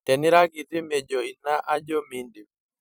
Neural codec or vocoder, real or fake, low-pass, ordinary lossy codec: vocoder, 44.1 kHz, 128 mel bands every 256 samples, BigVGAN v2; fake; none; none